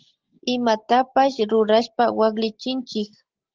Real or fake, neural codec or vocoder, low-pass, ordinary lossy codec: real; none; 7.2 kHz; Opus, 16 kbps